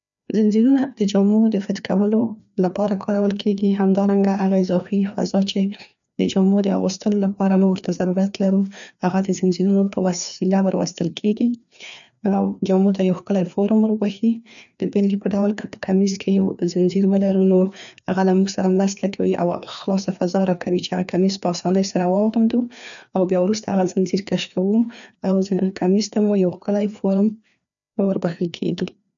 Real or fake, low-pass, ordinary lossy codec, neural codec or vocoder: fake; 7.2 kHz; none; codec, 16 kHz, 2 kbps, FreqCodec, larger model